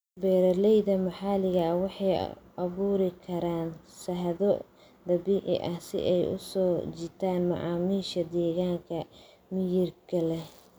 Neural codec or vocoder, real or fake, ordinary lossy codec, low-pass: none; real; none; none